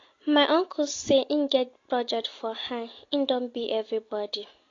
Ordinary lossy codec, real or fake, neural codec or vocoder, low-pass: AAC, 32 kbps; real; none; 7.2 kHz